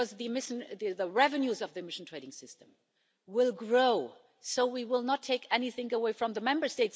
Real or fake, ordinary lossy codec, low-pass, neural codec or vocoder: real; none; none; none